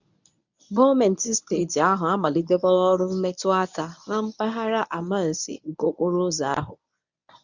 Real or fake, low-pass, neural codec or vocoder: fake; 7.2 kHz; codec, 24 kHz, 0.9 kbps, WavTokenizer, medium speech release version 1